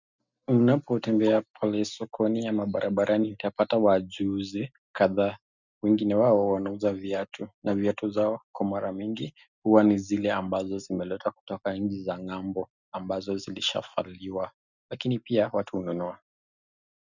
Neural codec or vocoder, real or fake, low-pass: none; real; 7.2 kHz